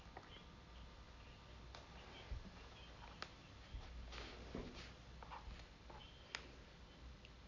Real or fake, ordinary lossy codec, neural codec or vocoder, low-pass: real; none; none; 7.2 kHz